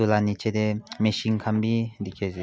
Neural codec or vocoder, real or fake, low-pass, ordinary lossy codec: none; real; none; none